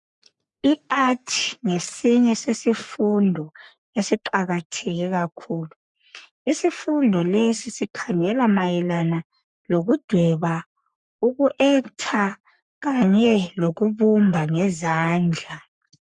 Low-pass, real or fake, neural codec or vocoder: 10.8 kHz; fake; codec, 44.1 kHz, 3.4 kbps, Pupu-Codec